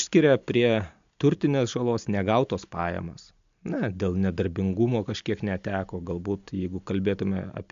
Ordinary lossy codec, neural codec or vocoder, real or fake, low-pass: MP3, 64 kbps; none; real; 7.2 kHz